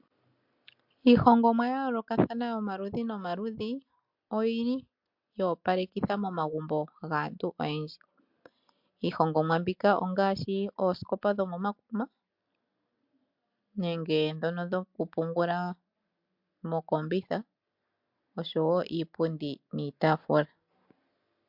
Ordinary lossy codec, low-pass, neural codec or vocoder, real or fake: MP3, 48 kbps; 5.4 kHz; none; real